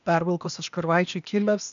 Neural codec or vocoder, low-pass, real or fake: codec, 16 kHz, 0.8 kbps, ZipCodec; 7.2 kHz; fake